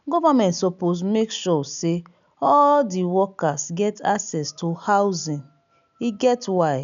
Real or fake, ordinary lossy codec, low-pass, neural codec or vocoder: real; none; 7.2 kHz; none